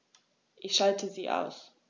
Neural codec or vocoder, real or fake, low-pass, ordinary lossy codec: none; real; none; none